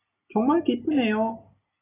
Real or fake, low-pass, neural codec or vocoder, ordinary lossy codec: real; 3.6 kHz; none; AAC, 16 kbps